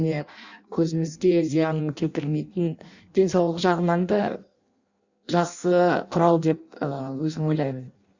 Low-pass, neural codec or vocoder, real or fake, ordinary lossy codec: 7.2 kHz; codec, 16 kHz in and 24 kHz out, 0.6 kbps, FireRedTTS-2 codec; fake; Opus, 64 kbps